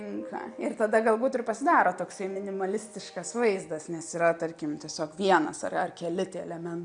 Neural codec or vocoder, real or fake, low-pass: none; real; 9.9 kHz